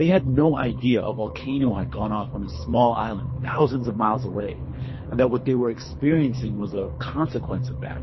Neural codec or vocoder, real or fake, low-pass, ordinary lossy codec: codec, 24 kHz, 3 kbps, HILCodec; fake; 7.2 kHz; MP3, 24 kbps